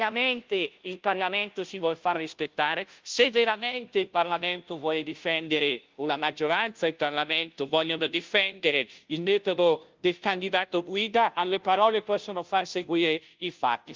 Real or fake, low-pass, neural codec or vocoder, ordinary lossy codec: fake; 7.2 kHz; codec, 16 kHz, 0.5 kbps, FunCodec, trained on Chinese and English, 25 frames a second; Opus, 32 kbps